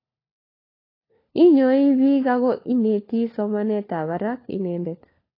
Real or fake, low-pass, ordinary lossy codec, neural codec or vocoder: fake; 5.4 kHz; AAC, 24 kbps; codec, 16 kHz, 4 kbps, FunCodec, trained on LibriTTS, 50 frames a second